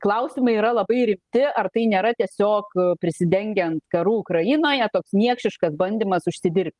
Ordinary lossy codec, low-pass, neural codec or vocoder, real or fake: Opus, 24 kbps; 10.8 kHz; none; real